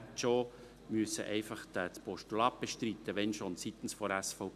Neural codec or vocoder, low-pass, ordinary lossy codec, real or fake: none; 14.4 kHz; none; real